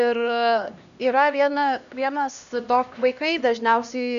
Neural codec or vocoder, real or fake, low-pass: codec, 16 kHz, 1 kbps, X-Codec, HuBERT features, trained on LibriSpeech; fake; 7.2 kHz